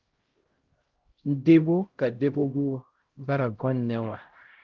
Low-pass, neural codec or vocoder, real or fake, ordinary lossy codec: 7.2 kHz; codec, 16 kHz, 0.5 kbps, X-Codec, HuBERT features, trained on LibriSpeech; fake; Opus, 16 kbps